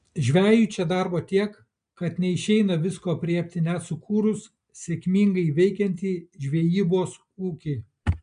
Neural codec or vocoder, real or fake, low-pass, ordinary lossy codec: none; real; 9.9 kHz; MP3, 64 kbps